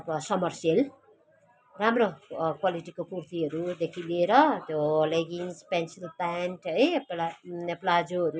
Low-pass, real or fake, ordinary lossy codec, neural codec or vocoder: none; real; none; none